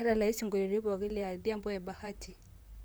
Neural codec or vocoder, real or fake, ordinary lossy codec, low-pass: vocoder, 44.1 kHz, 128 mel bands, Pupu-Vocoder; fake; none; none